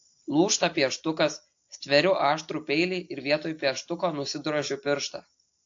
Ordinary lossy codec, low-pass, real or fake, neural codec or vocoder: AAC, 48 kbps; 7.2 kHz; real; none